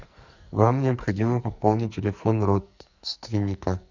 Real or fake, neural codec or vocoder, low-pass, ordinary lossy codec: fake; codec, 44.1 kHz, 2.6 kbps, SNAC; 7.2 kHz; Opus, 64 kbps